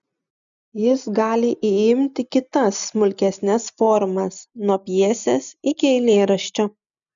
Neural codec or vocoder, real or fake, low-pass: none; real; 7.2 kHz